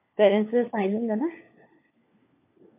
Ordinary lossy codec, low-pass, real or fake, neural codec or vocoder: MP3, 24 kbps; 3.6 kHz; fake; codec, 16 kHz, 4 kbps, FunCodec, trained on LibriTTS, 50 frames a second